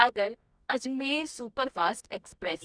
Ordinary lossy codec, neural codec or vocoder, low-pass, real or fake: Opus, 64 kbps; codec, 24 kHz, 0.9 kbps, WavTokenizer, medium music audio release; 9.9 kHz; fake